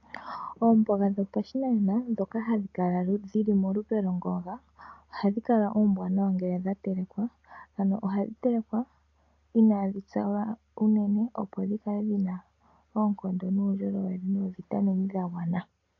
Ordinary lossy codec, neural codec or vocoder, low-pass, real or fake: AAC, 48 kbps; none; 7.2 kHz; real